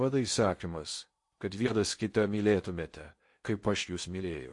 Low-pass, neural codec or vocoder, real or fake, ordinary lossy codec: 10.8 kHz; codec, 16 kHz in and 24 kHz out, 0.8 kbps, FocalCodec, streaming, 65536 codes; fake; MP3, 48 kbps